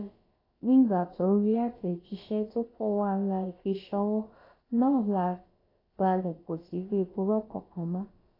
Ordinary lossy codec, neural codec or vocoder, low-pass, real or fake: AAC, 24 kbps; codec, 16 kHz, about 1 kbps, DyCAST, with the encoder's durations; 5.4 kHz; fake